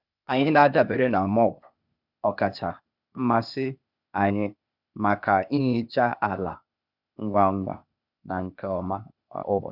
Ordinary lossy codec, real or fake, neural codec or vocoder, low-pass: none; fake; codec, 16 kHz, 0.8 kbps, ZipCodec; 5.4 kHz